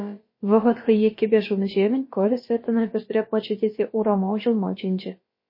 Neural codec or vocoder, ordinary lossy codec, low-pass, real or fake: codec, 16 kHz, about 1 kbps, DyCAST, with the encoder's durations; MP3, 24 kbps; 5.4 kHz; fake